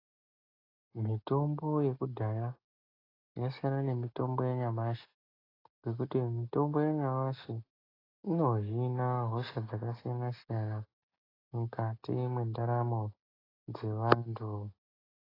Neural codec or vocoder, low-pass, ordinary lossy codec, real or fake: none; 5.4 kHz; AAC, 24 kbps; real